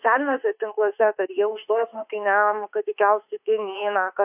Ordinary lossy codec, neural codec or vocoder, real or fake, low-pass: AAC, 32 kbps; autoencoder, 48 kHz, 32 numbers a frame, DAC-VAE, trained on Japanese speech; fake; 3.6 kHz